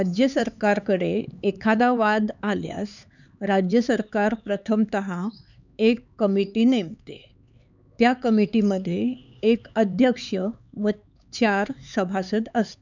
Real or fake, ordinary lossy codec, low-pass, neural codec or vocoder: fake; none; 7.2 kHz; codec, 16 kHz, 4 kbps, X-Codec, HuBERT features, trained on LibriSpeech